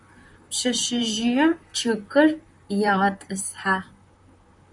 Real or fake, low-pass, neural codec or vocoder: fake; 10.8 kHz; vocoder, 44.1 kHz, 128 mel bands, Pupu-Vocoder